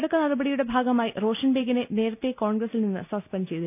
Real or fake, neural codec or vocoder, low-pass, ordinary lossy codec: real; none; 3.6 kHz; none